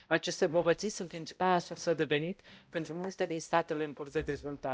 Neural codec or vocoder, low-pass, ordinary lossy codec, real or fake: codec, 16 kHz, 0.5 kbps, X-Codec, HuBERT features, trained on balanced general audio; none; none; fake